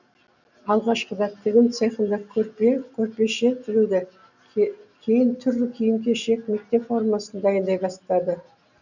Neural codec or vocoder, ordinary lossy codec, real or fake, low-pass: none; none; real; 7.2 kHz